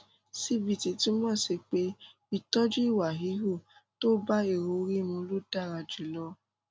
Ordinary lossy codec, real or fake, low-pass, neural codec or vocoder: none; real; none; none